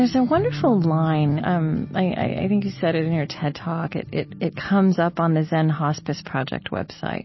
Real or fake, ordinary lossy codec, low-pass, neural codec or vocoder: real; MP3, 24 kbps; 7.2 kHz; none